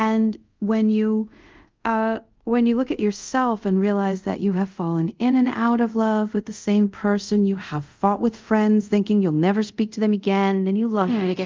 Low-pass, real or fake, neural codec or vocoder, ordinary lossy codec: 7.2 kHz; fake; codec, 24 kHz, 0.5 kbps, DualCodec; Opus, 24 kbps